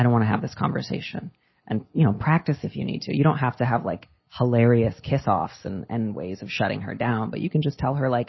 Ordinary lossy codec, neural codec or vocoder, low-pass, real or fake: MP3, 24 kbps; none; 7.2 kHz; real